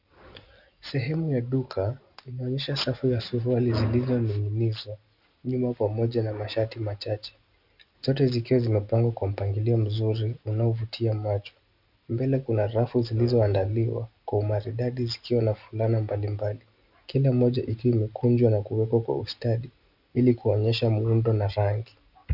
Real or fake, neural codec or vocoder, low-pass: real; none; 5.4 kHz